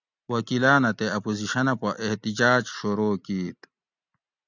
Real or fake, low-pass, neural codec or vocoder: real; 7.2 kHz; none